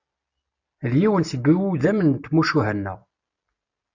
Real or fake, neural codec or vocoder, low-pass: real; none; 7.2 kHz